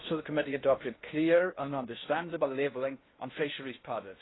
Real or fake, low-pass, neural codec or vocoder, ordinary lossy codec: fake; 7.2 kHz; codec, 16 kHz in and 24 kHz out, 0.6 kbps, FocalCodec, streaming, 2048 codes; AAC, 16 kbps